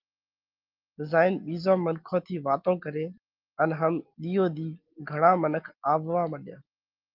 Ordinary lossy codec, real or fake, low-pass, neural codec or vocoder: Opus, 32 kbps; real; 5.4 kHz; none